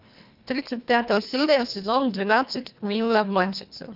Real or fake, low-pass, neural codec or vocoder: fake; 5.4 kHz; codec, 24 kHz, 1.5 kbps, HILCodec